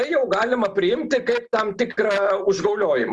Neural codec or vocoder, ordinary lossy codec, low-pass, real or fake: none; Opus, 24 kbps; 10.8 kHz; real